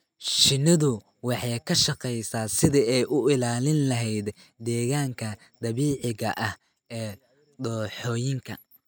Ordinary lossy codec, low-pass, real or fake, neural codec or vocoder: none; none; real; none